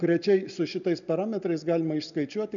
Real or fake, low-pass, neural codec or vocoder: real; 7.2 kHz; none